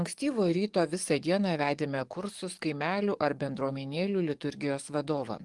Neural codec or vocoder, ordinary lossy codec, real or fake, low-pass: codec, 44.1 kHz, 7.8 kbps, DAC; Opus, 24 kbps; fake; 10.8 kHz